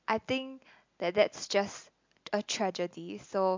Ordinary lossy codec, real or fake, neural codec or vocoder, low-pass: MP3, 64 kbps; real; none; 7.2 kHz